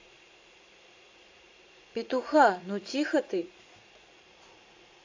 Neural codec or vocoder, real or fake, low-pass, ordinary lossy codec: vocoder, 44.1 kHz, 80 mel bands, Vocos; fake; 7.2 kHz; AAC, 48 kbps